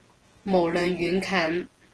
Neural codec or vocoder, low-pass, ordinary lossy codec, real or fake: vocoder, 48 kHz, 128 mel bands, Vocos; 10.8 kHz; Opus, 16 kbps; fake